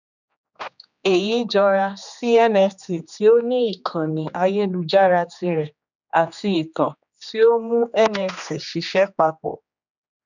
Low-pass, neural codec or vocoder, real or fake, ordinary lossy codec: 7.2 kHz; codec, 16 kHz, 2 kbps, X-Codec, HuBERT features, trained on general audio; fake; none